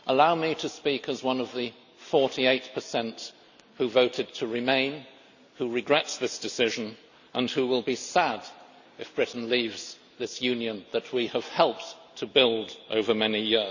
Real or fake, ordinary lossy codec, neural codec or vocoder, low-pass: real; none; none; 7.2 kHz